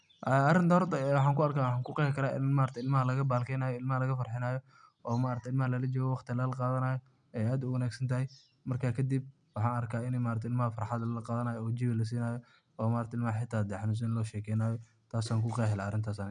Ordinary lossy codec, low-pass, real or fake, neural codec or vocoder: none; 9.9 kHz; real; none